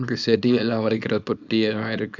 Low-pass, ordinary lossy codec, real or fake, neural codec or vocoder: 7.2 kHz; Opus, 64 kbps; fake; codec, 24 kHz, 0.9 kbps, WavTokenizer, small release